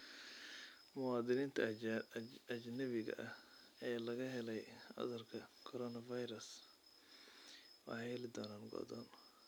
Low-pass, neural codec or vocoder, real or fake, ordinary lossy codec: none; none; real; none